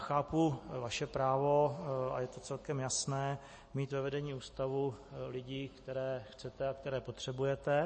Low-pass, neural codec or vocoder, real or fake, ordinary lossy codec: 10.8 kHz; none; real; MP3, 32 kbps